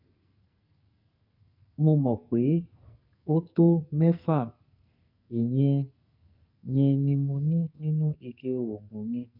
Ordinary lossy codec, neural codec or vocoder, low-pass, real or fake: none; codec, 44.1 kHz, 2.6 kbps, SNAC; 5.4 kHz; fake